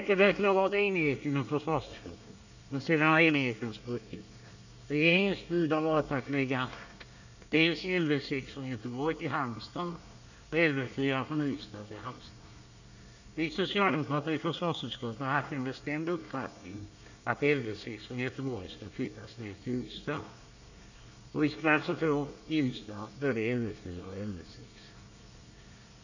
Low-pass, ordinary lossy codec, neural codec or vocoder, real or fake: 7.2 kHz; none; codec, 24 kHz, 1 kbps, SNAC; fake